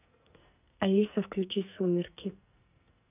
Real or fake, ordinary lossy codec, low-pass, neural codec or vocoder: fake; none; 3.6 kHz; codec, 44.1 kHz, 2.6 kbps, SNAC